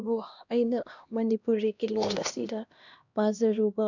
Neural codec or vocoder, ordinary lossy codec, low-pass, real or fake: codec, 16 kHz, 1 kbps, X-Codec, HuBERT features, trained on LibriSpeech; none; 7.2 kHz; fake